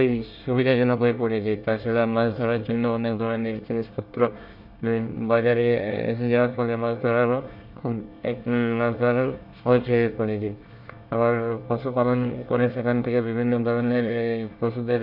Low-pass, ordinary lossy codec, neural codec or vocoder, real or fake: 5.4 kHz; none; codec, 24 kHz, 1 kbps, SNAC; fake